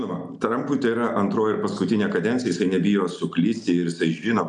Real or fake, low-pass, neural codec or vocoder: real; 10.8 kHz; none